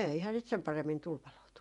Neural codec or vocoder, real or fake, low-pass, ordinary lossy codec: vocoder, 24 kHz, 100 mel bands, Vocos; fake; 10.8 kHz; none